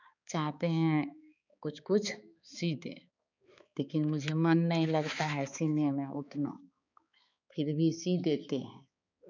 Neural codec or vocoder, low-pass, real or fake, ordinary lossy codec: codec, 16 kHz, 4 kbps, X-Codec, HuBERT features, trained on balanced general audio; 7.2 kHz; fake; none